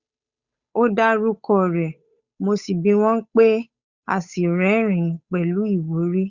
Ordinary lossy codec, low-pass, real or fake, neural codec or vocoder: Opus, 64 kbps; 7.2 kHz; fake; codec, 16 kHz, 8 kbps, FunCodec, trained on Chinese and English, 25 frames a second